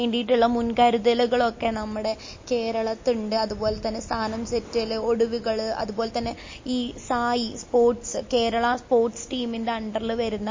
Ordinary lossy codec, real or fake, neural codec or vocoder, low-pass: MP3, 32 kbps; real; none; 7.2 kHz